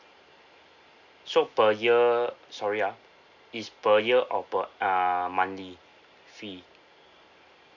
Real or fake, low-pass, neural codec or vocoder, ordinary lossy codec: real; 7.2 kHz; none; none